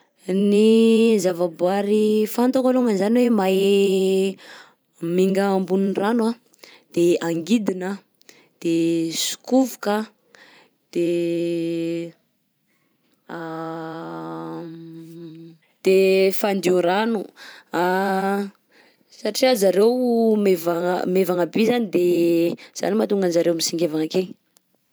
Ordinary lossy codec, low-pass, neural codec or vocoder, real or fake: none; none; vocoder, 44.1 kHz, 128 mel bands every 512 samples, BigVGAN v2; fake